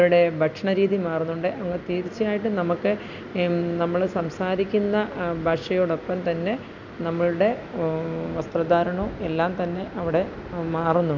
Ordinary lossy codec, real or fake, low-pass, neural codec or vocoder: none; real; 7.2 kHz; none